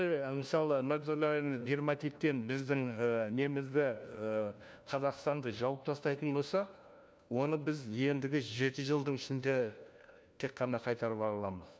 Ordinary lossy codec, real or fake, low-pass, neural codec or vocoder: none; fake; none; codec, 16 kHz, 1 kbps, FunCodec, trained on LibriTTS, 50 frames a second